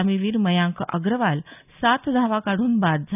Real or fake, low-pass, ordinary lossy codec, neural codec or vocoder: real; 3.6 kHz; none; none